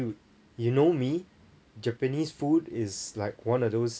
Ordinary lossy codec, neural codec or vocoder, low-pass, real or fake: none; none; none; real